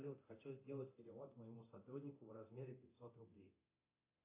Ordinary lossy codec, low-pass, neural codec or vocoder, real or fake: AAC, 24 kbps; 3.6 kHz; codec, 24 kHz, 0.9 kbps, DualCodec; fake